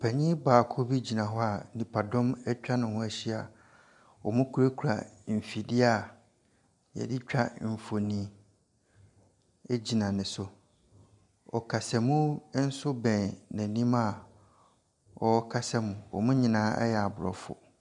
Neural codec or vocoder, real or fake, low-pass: none; real; 10.8 kHz